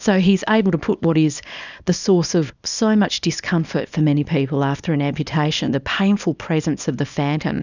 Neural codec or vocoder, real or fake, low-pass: codec, 24 kHz, 0.9 kbps, WavTokenizer, small release; fake; 7.2 kHz